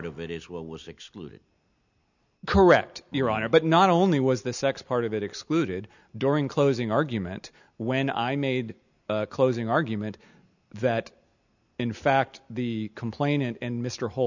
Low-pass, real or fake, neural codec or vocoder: 7.2 kHz; real; none